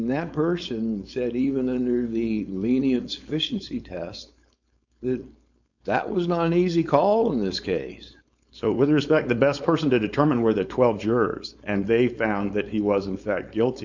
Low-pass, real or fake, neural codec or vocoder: 7.2 kHz; fake; codec, 16 kHz, 4.8 kbps, FACodec